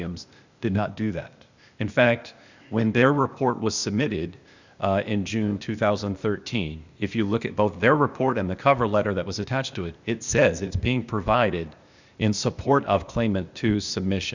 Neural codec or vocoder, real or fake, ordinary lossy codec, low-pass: codec, 16 kHz, 0.8 kbps, ZipCodec; fake; Opus, 64 kbps; 7.2 kHz